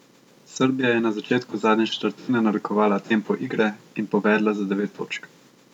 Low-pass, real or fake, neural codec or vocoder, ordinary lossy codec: 19.8 kHz; real; none; none